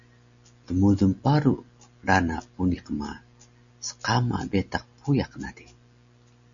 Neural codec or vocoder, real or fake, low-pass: none; real; 7.2 kHz